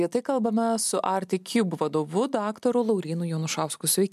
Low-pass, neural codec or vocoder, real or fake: 14.4 kHz; none; real